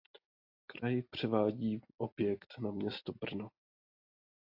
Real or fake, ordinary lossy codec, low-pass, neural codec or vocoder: real; AAC, 48 kbps; 5.4 kHz; none